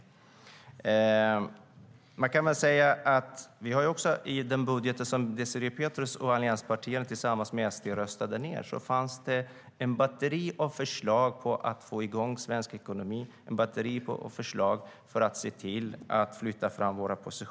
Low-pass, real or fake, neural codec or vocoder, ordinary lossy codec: none; real; none; none